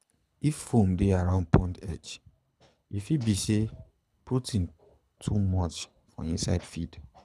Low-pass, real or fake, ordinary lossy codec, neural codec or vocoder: none; fake; none; codec, 24 kHz, 6 kbps, HILCodec